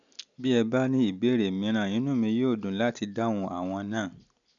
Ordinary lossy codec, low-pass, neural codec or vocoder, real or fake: none; 7.2 kHz; none; real